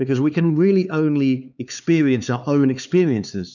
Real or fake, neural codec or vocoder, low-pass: fake; codec, 16 kHz, 4 kbps, X-Codec, HuBERT features, trained on LibriSpeech; 7.2 kHz